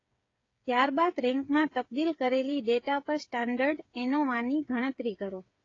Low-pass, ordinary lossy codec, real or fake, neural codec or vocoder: 7.2 kHz; AAC, 32 kbps; fake; codec, 16 kHz, 8 kbps, FreqCodec, smaller model